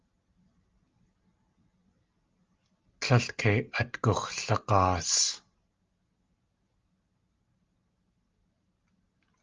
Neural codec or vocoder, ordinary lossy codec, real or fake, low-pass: none; Opus, 32 kbps; real; 7.2 kHz